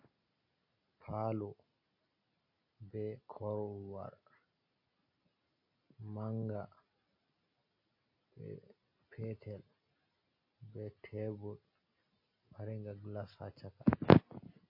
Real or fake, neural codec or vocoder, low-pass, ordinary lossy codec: real; none; 5.4 kHz; MP3, 32 kbps